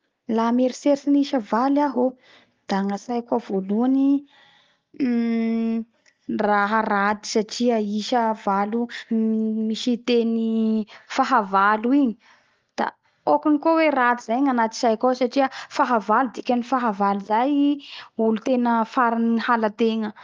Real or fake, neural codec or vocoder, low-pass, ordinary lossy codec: real; none; 7.2 kHz; Opus, 32 kbps